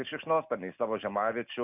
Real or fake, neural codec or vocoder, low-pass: real; none; 3.6 kHz